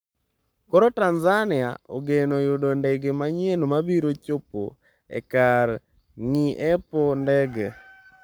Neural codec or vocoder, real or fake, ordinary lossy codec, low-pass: codec, 44.1 kHz, 7.8 kbps, Pupu-Codec; fake; none; none